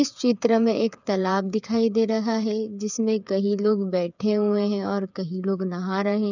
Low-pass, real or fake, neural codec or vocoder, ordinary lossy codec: 7.2 kHz; fake; codec, 16 kHz, 8 kbps, FreqCodec, smaller model; none